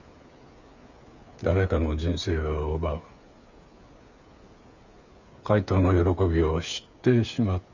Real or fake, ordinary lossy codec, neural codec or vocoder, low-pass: fake; none; codec, 16 kHz, 8 kbps, FreqCodec, smaller model; 7.2 kHz